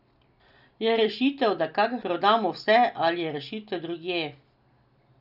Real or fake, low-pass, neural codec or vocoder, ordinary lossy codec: real; 5.4 kHz; none; none